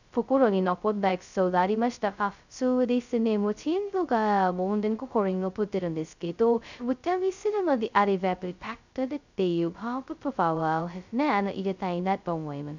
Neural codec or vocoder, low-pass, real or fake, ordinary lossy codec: codec, 16 kHz, 0.2 kbps, FocalCodec; 7.2 kHz; fake; none